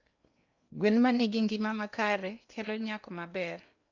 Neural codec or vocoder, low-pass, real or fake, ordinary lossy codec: codec, 16 kHz in and 24 kHz out, 0.8 kbps, FocalCodec, streaming, 65536 codes; 7.2 kHz; fake; none